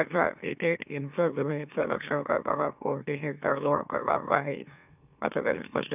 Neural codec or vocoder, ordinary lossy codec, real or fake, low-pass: autoencoder, 44.1 kHz, a latent of 192 numbers a frame, MeloTTS; none; fake; 3.6 kHz